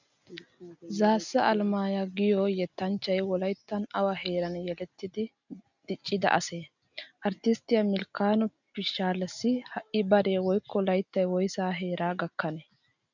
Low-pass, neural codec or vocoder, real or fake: 7.2 kHz; none; real